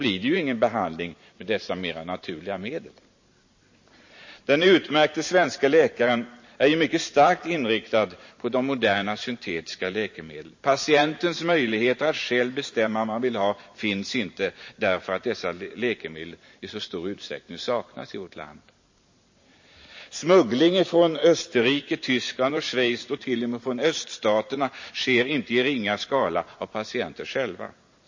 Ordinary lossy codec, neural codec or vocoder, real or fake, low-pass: MP3, 32 kbps; vocoder, 44.1 kHz, 128 mel bands every 512 samples, BigVGAN v2; fake; 7.2 kHz